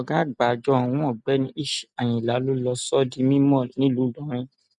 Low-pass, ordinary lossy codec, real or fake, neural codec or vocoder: 10.8 kHz; none; real; none